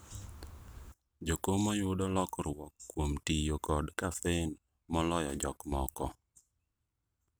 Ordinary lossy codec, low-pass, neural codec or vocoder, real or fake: none; none; none; real